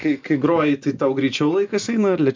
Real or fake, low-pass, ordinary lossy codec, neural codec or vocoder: real; 7.2 kHz; MP3, 64 kbps; none